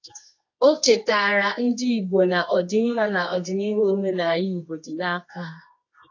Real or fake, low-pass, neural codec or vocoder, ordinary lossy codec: fake; 7.2 kHz; codec, 24 kHz, 0.9 kbps, WavTokenizer, medium music audio release; AAC, 48 kbps